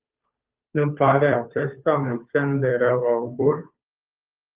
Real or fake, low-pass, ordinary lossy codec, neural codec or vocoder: fake; 3.6 kHz; Opus, 24 kbps; codec, 16 kHz, 2 kbps, FunCodec, trained on Chinese and English, 25 frames a second